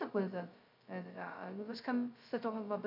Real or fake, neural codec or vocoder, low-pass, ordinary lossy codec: fake; codec, 16 kHz, 0.2 kbps, FocalCodec; 5.4 kHz; MP3, 48 kbps